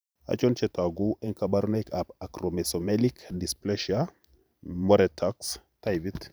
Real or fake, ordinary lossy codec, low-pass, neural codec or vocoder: fake; none; none; vocoder, 44.1 kHz, 128 mel bands every 512 samples, BigVGAN v2